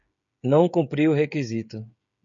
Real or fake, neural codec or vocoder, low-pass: fake; codec, 16 kHz, 16 kbps, FreqCodec, smaller model; 7.2 kHz